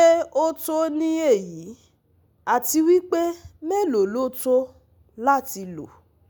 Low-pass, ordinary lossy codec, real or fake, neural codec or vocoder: none; none; real; none